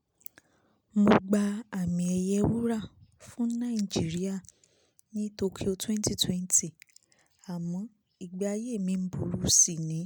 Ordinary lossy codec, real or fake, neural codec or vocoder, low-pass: none; real; none; none